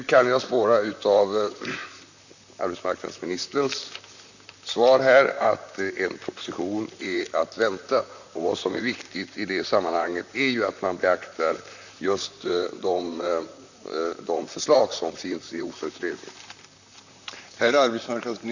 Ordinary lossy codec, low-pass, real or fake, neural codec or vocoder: none; 7.2 kHz; fake; vocoder, 44.1 kHz, 128 mel bands, Pupu-Vocoder